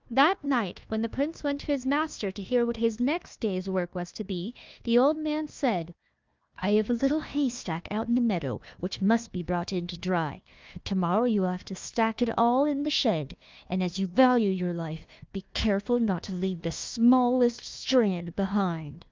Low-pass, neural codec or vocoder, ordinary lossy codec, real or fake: 7.2 kHz; codec, 16 kHz, 1 kbps, FunCodec, trained on Chinese and English, 50 frames a second; Opus, 24 kbps; fake